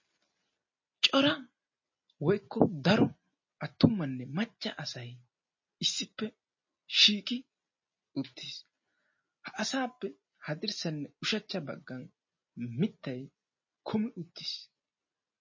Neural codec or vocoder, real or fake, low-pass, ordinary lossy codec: none; real; 7.2 kHz; MP3, 32 kbps